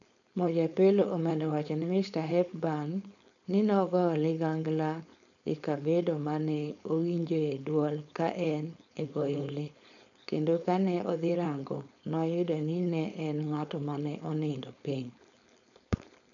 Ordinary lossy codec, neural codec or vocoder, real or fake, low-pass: none; codec, 16 kHz, 4.8 kbps, FACodec; fake; 7.2 kHz